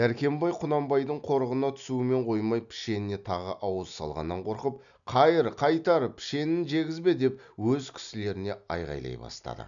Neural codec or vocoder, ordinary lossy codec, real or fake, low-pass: none; none; real; 7.2 kHz